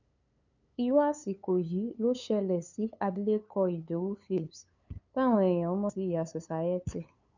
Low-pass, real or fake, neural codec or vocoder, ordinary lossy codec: 7.2 kHz; fake; codec, 16 kHz, 8 kbps, FunCodec, trained on LibriTTS, 25 frames a second; none